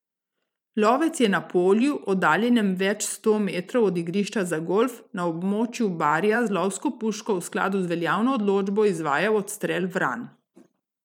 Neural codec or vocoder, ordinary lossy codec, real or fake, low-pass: vocoder, 44.1 kHz, 128 mel bands every 512 samples, BigVGAN v2; none; fake; 19.8 kHz